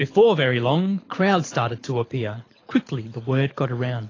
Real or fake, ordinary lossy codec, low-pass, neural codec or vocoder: fake; AAC, 32 kbps; 7.2 kHz; codec, 24 kHz, 6 kbps, HILCodec